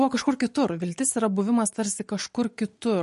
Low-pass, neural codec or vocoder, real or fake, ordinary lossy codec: 14.4 kHz; none; real; MP3, 48 kbps